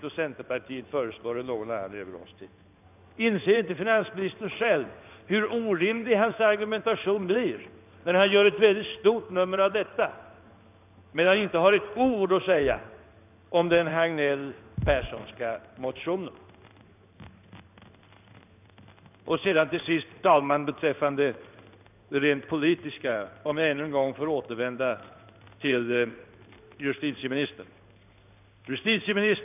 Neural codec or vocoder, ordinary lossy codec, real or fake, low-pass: codec, 16 kHz in and 24 kHz out, 1 kbps, XY-Tokenizer; none; fake; 3.6 kHz